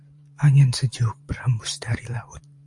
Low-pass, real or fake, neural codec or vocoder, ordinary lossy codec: 10.8 kHz; fake; vocoder, 44.1 kHz, 128 mel bands every 512 samples, BigVGAN v2; MP3, 64 kbps